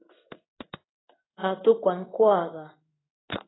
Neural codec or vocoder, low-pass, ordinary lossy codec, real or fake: codec, 16 kHz in and 24 kHz out, 1 kbps, XY-Tokenizer; 7.2 kHz; AAC, 16 kbps; fake